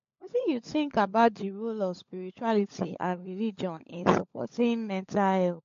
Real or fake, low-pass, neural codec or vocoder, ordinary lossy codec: fake; 7.2 kHz; codec, 16 kHz, 16 kbps, FunCodec, trained on LibriTTS, 50 frames a second; MP3, 48 kbps